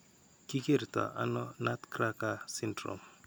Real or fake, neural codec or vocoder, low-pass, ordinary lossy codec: real; none; none; none